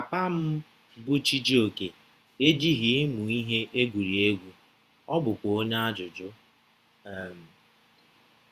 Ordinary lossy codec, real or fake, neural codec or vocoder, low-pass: Opus, 64 kbps; fake; vocoder, 48 kHz, 128 mel bands, Vocos; 14.4 kHz